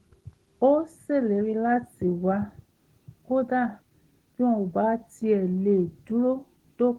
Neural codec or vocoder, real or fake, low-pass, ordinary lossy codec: none; real; 19.8 kHz; Opus, 16 kbps